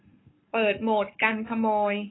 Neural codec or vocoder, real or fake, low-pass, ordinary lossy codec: codec, 44.1 kHz, 7.8 kbps, Pupu-Codec; fake; 7.2 kHz; AAC, 16 kbps